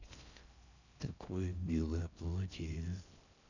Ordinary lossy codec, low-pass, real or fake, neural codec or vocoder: none; 7.2 kHz; fake; codec, 16 kHz in and 24 kHz out, 0.6 kbps, FocalCodec, streaming, 4096 codes